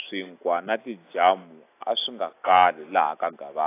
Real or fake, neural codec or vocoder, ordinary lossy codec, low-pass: real; none; AAC, 24 kbps; 3.6 kHz